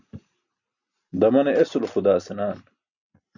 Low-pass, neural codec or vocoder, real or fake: 7.2 kHz; none; real